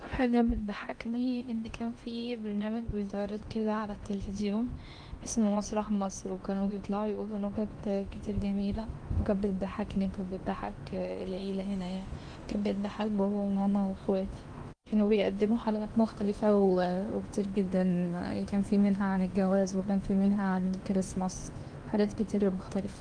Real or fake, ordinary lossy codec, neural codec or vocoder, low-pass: fake; Opus, 32 kbps; codec, 16 kHz in and 24 kHz out, 0.8 kbps, FocalCodec, streaming, 65536 codes; 9.9 kHz